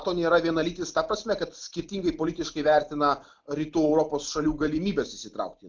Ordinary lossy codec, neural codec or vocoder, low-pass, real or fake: Opus, 32 kbps; none; 7.2 kHz; real